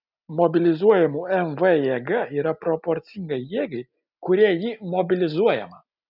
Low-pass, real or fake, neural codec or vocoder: 5.4 kHz; real; none